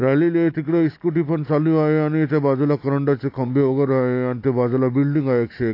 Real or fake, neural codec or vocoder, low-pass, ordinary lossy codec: real; none; 5.4 kHz; AAC, 32 kbps